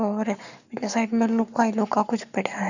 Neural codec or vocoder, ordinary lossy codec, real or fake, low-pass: codec, 44.1 kHz, 7.8 kbps, Pupu-Codec; none; fake; 7.2 kHz